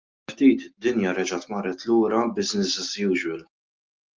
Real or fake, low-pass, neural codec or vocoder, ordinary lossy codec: real; 7.2 kHz; none; Opus, 32 kbps